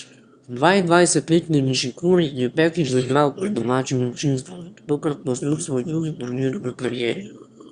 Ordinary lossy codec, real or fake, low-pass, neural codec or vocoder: Opus, 64 kbps; fake; 9.9 kHz; autoencoder, 22.05 kHz, a latent of 192 numbers a frame, VITS, trained on one speaker